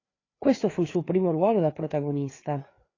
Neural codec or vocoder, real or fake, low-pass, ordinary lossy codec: codec, 16 kHz, 4 kbps, FreqCodec, larger model; fake; 7.2 kHz; AAC, 32 kbps